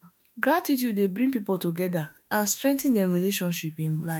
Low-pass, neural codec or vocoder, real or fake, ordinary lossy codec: 19.8 kHz; autoencoder, 48 kHz, 32 numbers a frame, DAC-VAE, trained on Japanese speech; fake; none